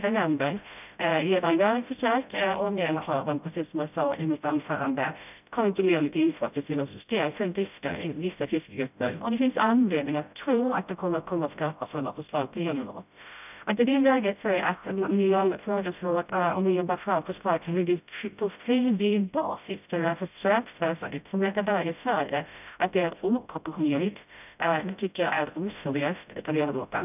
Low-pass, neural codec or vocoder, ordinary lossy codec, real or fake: 3.6 kHz; codec, 16 kHz, 0.5 kbps, FreqCodec, smaller model; none; fake